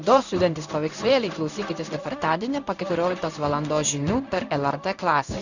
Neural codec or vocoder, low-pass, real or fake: codec, 16 kHz in and 24 kHz out, 1 kbps, XY-Tokenizer; 7.2 kHz; fake